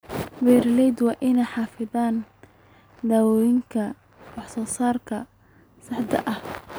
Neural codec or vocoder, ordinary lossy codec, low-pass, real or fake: none; none; none; real